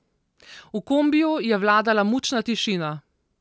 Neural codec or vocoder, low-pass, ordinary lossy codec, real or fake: none; none; none; real